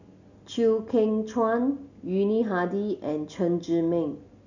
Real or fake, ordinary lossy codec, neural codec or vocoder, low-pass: real; none; none; 7.2 kHz